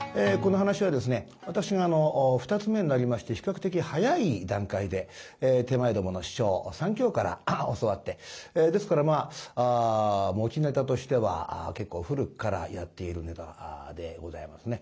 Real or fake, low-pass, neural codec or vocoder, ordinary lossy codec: real; none; none; none